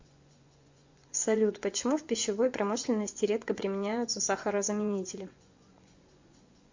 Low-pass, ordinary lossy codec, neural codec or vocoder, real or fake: 7.2 kHz; MP3, 48 kbps; vocoder, 24 kHz, 100 mel bands, Vocos; fake